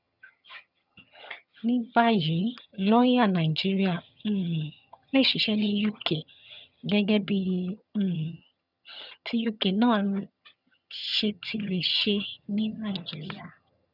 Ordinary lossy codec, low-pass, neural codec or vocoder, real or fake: none; 5.4 kHz; vocoder, 22.05 kHz, 80 mel bands, HiFi-GAN; fake